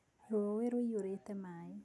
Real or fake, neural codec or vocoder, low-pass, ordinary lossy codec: real; none; none; none